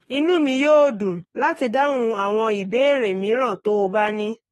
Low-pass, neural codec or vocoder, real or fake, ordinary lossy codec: 14.4 kHz; codec, 32 kHz, 1.9 kbps, SNAC; fake; AAC, 32 kbps